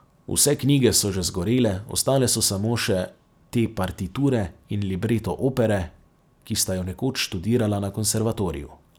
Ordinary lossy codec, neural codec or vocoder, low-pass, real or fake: none; none; none; real